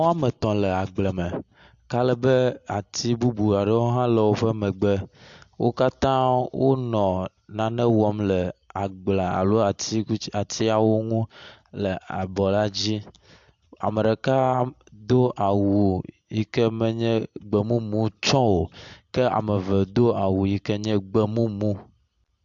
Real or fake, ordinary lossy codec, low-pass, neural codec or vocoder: real; AAC, 64 kbps; 7.2 kHz; none